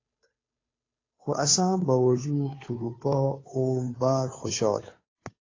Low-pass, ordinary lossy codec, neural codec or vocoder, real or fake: 7.2 kHz; AAC, 32 kbps; codec, 16 kHz, 2 kbps, FunCodec, trained on Chinese and English, 25 frames a second; fake